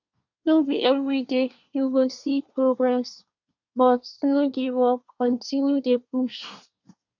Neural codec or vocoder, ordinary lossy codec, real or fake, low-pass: codec, 24 kHz, 1 kbps, SNAC; none; fake; 7.2 kHz